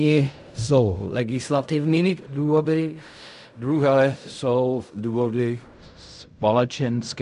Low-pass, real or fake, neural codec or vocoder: 10.8 kHz; fake; codec, 16 kHz in and 24 kHz out, 0.4 kbps, LongCat-Audio-Codec, fine tuned four codebook decoder